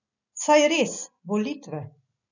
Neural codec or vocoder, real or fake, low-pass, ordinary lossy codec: none; real; 7.2 kHz; none